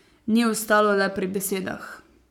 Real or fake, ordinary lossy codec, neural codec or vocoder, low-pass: fake; none; codec, 44.1 kHz, 7.8 kbps, Pupu-Codec; 19.8 kHz